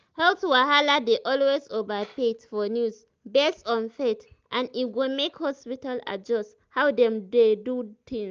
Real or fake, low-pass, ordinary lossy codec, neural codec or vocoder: real; 7.2 kHz; Opus, 24 kbps; none